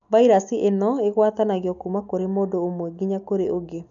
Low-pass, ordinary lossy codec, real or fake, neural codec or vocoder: 7.2 kHz; none; real; none